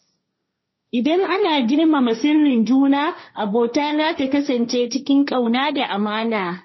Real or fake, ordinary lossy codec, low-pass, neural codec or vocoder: fake; MP3, 24 kbps; 7.2 kHz; codec, 16 kHz, 1.1 kbps, Voila-Tokenizer